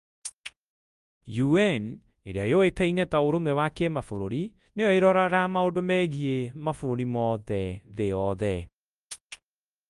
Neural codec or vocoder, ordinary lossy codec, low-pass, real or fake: codec, 24 kHz, 0.9 kbps, WavTokenizer, large speech release; Opus, 32 kbps; 10.8 kHz; fake